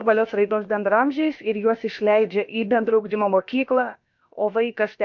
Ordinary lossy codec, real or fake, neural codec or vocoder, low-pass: MP3, 48 kbps; fake; codec, 16 kHz, about 1 kbps, DyCAST, with the encoder's durations; 7.2 kHz